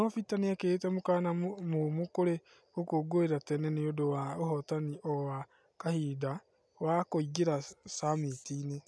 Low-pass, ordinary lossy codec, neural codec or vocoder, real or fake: none; none; none; real